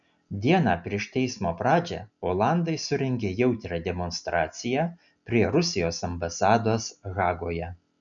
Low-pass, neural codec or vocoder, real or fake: 7.2 kHz; none; real